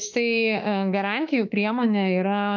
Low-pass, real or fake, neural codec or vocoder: 7.2 kHz; fake; autoencoder, 48 kHz, 32 numbers a frame, DAC-VAE, trained on Japanese speech